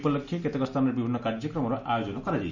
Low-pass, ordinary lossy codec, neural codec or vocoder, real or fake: 7.2 kHz; none; none; real